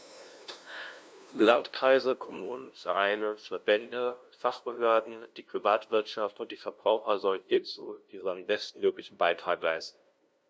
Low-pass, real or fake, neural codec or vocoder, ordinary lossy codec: none; fake; codec, 16 kHz, 0.5 kbps, FunCodec, trained on LibriTTS, 25 frames a second; none